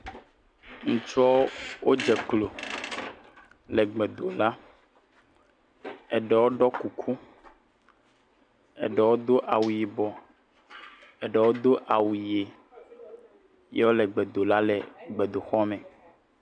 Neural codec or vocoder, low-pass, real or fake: none; 9.9 kHz; real